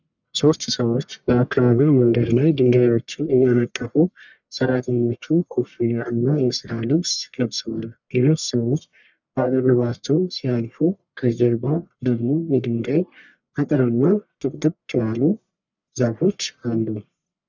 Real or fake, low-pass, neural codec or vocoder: fake; 7.2 kHz; codec, 44.1 kHz, 1.7 kbps, Pupu-Codec